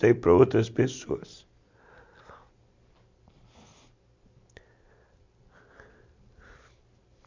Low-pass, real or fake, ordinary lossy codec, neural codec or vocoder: 7.2 kHz; real; MP3, 64 kbps; none